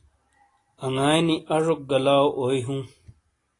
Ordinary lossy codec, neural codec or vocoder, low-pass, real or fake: AAC, 32 kbps; none; 10.8 kHz; real